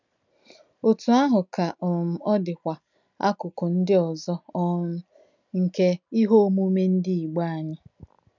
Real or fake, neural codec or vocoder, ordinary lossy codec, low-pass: real; none; none; 7.2 kHz